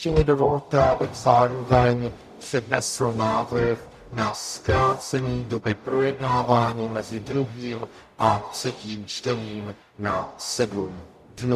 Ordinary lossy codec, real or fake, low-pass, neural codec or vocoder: MP3, 96 kbps; fake; 14.4 kHz; codec, 44.1 kHz, 0.9 kbps, DAC